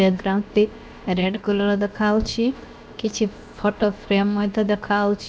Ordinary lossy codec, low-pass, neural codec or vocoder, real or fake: none; none; codec, 16 kHz, 0.7 kbps, FocalCodec; fake